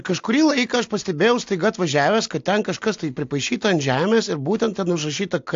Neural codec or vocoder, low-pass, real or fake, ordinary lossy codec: none; 7.2 kHz; real; AAC, 48 kbps